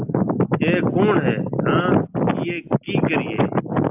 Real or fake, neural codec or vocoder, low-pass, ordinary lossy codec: real; none; 3.6 kHz; AAC, 32 kbps